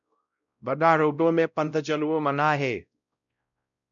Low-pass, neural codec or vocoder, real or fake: 7.2 kHz; codec, 16 kHz, 0.5 kbps, X-Codec, WavLM features, trained on Multilingual LibriSpeech; fake